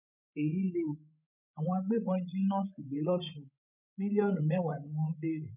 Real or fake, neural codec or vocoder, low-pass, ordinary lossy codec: fake; codec, 16 kHz, 16 kbps, FreqCodec, larger model; 3.6 kHz; none